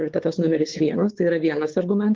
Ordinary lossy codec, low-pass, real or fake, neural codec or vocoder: Opus, 16 kbps; 7.2 kHz; fake; codec, 16 kHz, 4 kbps, X-Codec, WavLM features, trained on Multilingual LibriSpeech